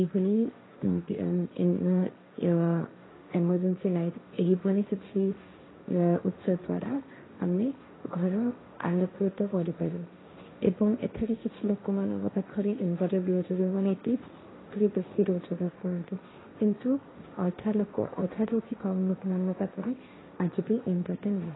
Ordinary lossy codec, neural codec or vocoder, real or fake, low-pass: AAC, 16 kbps; codec, 16 kHz, 1.1 kbps, Voila-Tokenizer; fake; 7.2 kHz